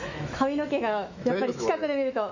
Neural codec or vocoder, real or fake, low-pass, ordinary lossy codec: autoencoder, 48 kHz, 128 numbers a frame, DAC-VAE, trained on Japanese speech; fake; 7.2 kHz; none